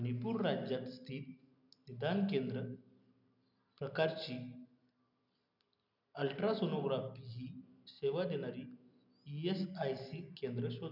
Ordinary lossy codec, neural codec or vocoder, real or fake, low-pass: none; none; real; 5.4 kHz